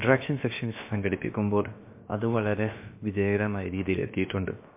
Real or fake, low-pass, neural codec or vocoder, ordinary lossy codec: fake; 3.6 kHz; codec, 16 kHz, about 1 kbps, DyCAST, with the encoder's durations; MP3, 24 kbps